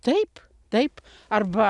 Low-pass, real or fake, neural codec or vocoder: 10.8 kHz; real; none